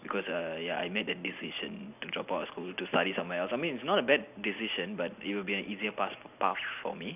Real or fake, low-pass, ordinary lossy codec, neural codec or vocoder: real; 3.6 kHz; none; none